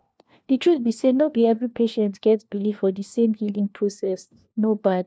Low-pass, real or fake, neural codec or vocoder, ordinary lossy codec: none; fake; codec, 16 kHz, 1 kbps, FunCodec, trained on LibriTTS, 50 frames a second; none